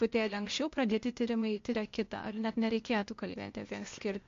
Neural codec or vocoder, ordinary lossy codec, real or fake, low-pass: codec, 16 kHz, 0.8 kbps, ZipCodec; MP3, 48 kbps; fake; 7.2 kHz